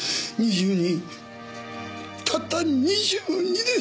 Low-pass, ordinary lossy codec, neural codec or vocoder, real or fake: none; none; none; real